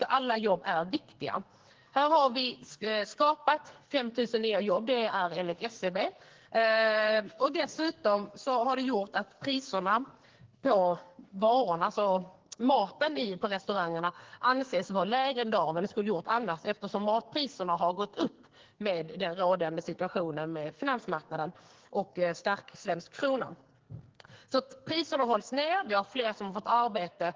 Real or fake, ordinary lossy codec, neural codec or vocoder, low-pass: fake; Opus, 16 kbps; codec, 44.1 kHz, 2.6 kbps, SNAC; 7.2 kHz